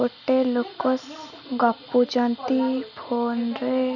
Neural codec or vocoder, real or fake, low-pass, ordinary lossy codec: none; real; 7.2 kHz; none